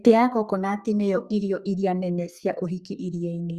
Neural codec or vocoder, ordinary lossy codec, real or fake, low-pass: codec, 32 kHz, 1.9 kbps, SNAC; Opus, 64 kbps; fake; 14.4 kHz